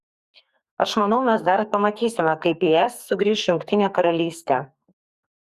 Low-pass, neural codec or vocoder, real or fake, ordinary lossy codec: 14.4 kHz; codec, 44.1 kHz, 2.6 kbps, SNAC; fake; Opus, 64 kbps